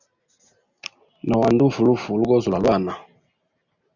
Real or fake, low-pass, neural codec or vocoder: real; 7.2 kHz; none